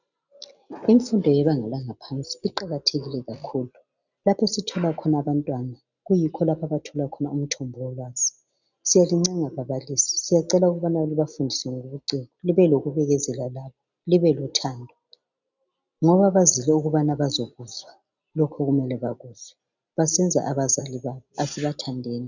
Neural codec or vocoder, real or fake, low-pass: none; real; 7.2 kHz